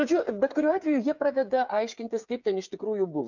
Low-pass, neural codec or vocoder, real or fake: 7.2 kHz; codec, 16 kHz, 8 kbps, FreqCodec, smaller model; fake